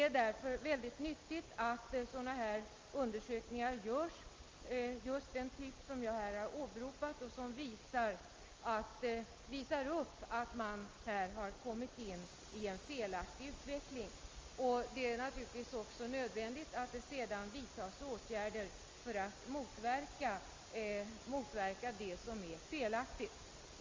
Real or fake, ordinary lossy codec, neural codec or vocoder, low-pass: real; Opus, 32 kbps; none; 7.2 kHz